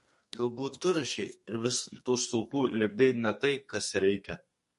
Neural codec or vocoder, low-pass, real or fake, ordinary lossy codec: codec, 32 kHz, 1.9 kbps, SNAC; 14.4 kHz; fake; MP3, 48 kbps